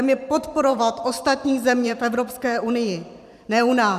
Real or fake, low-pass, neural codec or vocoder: fake; 14.4 kHz; vocoder, 44.1 kHz, 128 mel bands every 512 samples, BigVGAN v2